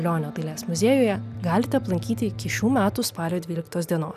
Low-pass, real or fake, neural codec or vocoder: 14.4 kHz; real; none